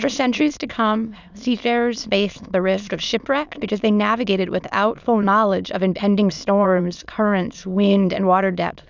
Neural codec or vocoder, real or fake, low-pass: autoencoder, 22.05 kHz, a latent of 192 numbers a frame, VITS, trained on many speakers; fake; 7.2 kHz